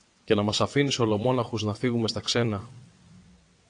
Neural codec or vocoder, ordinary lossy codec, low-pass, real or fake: vocoder, 22.05 kHz, 80 mel bands, WaveNeXt; AAC, 48 kbps; 9.9 kHz; fake